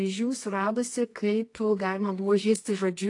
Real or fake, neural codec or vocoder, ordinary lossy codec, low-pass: fake; codec, 24 kHz, 0.9 kbps, WavTokenizer, medium music audio release; AAC, 48 kbps; 10.8 kHz